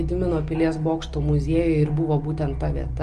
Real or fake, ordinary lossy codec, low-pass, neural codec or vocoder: real; Opus, 24 kbps; 9.9 kHz; none